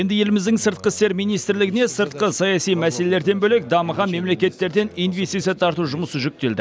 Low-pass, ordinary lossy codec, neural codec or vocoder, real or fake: none; none; none; real